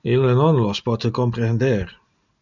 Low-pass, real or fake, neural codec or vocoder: 7.2 kHz; real; none